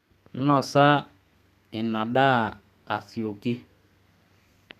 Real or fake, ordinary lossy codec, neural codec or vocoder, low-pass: fake; none; codec, 32 kHz, 1.9 kbps, SNAC; 14.4 kHz